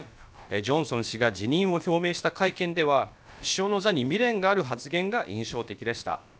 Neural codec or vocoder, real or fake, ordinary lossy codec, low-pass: codec, 16 kHz, about 1 kbps, DyCAST, with the encoder's durations; fake; none; none